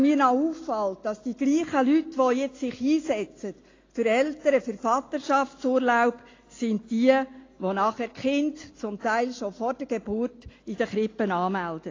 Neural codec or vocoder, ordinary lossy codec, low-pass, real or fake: none; AAC, 32 kbps; 7.2 kHz; real